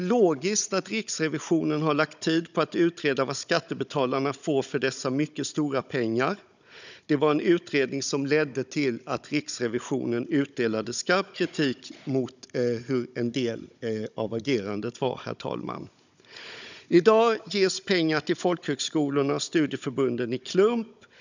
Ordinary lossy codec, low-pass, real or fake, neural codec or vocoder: none; 7.2 kHz; fake; codec, 16 kHz, 16 kbps, FunCodec, trained on Chinese and English, 50 frames a second